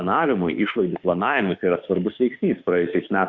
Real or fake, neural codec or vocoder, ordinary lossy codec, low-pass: fake; autoencoder, 48 kHz, 32 numbers a frame, DAC-VAE, trained on Japanese speech; Opus, 64 kbps; 7.2 kHz